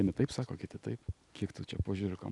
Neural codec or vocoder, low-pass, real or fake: none; 10.8 kHz; real